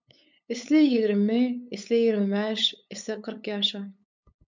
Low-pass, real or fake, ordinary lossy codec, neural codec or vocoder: 7.2 kHz; fake; MP3, 64 kbps; codec, 16 kHz, 8 kbps, FunCodec, trained on LibriTTS, 25 frames a second